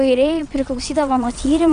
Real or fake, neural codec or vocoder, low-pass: fake; vocoder, 22.05 kHz, 80 mel bands, WaveNeXt; 9.9 kHz